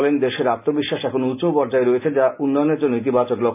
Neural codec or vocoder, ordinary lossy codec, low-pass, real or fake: none; none; 3.6 kHz; real